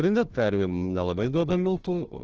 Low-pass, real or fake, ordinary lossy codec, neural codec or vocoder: 7.2 kHz; fake; Opus, 16 kbps; codec, 16 kHz, 1 kbps, FunCodec, trained on Chinese and English, 50 frames a second